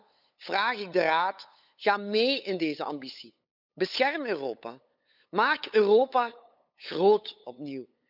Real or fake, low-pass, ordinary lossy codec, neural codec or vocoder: fake; 5.4 kHz; none; codec, 16 kHz, 8 kbps, FunCodec, trained on LibriTTS, 25 frames a second